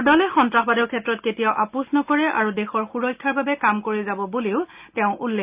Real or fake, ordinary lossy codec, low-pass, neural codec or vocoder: real; Opus, 24 kbps; 3.6 kHz; none